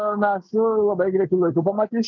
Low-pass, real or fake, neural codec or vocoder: 7.2 kHz; real; none